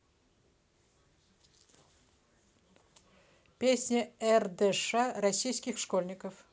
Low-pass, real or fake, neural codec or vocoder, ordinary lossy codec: none; real; none; none